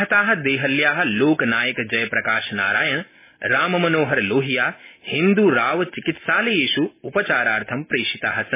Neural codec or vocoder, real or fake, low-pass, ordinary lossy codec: none; real; 3.6 kHz; MP3, 16 kbps